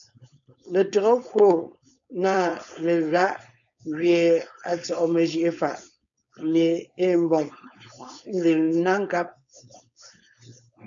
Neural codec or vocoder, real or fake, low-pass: codec, 16 kHz, 4.8 kbps, FACodec; fake; 7.2 kHz